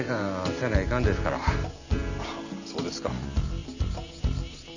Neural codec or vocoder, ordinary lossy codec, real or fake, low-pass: none; none; real; 7.2 kHz